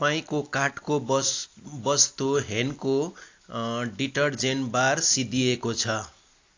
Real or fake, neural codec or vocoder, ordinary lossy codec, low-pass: real; none; AAC, 48 kbps; 7.2 kHz